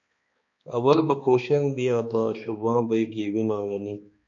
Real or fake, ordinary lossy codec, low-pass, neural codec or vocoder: fake; MP3, 48 kbps; 7.2 kHz; codec, 16 kHz, 2 kbps, X-Codec, HuBERT features, trained on balanced general audio